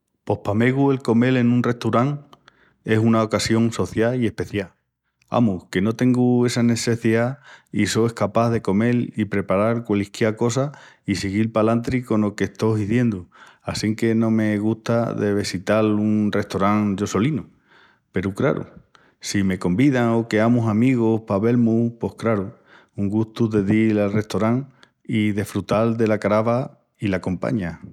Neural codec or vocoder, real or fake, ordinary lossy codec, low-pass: none; real; none; 19.8 kHz